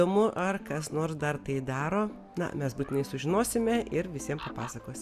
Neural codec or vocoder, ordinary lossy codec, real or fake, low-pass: none; Opus, 64 kbps; real; 14.4 kHz